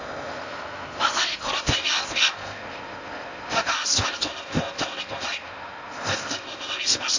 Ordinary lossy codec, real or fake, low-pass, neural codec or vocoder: AAC, 48 kbps; fake; 7.2 kHz; codec, 16 kHz in and 24 kHz out, 0.6 kbps, FocalCodec, streaming, 4096 codes